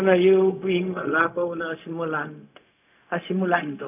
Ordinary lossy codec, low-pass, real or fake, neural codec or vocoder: none; 3.6 kHz; fake; codec, 16 kHz, 0.4 kbps, LongCat-Audio-Codec